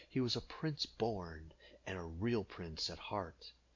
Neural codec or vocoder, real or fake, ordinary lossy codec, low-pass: none; real; MP3, 64 kbps; 7.2 kHz